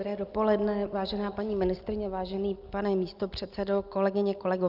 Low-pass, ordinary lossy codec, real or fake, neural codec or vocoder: 5.4 kHz; Opus, 24 kbps; real; none